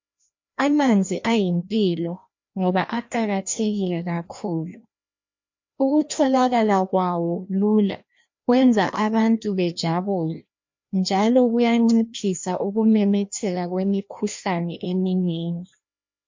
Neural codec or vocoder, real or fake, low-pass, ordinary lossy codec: codec, 16 kHz, 1 kbps, FreqCodec, larger model; fake; 7.2 kHz; MP3, 48 kbps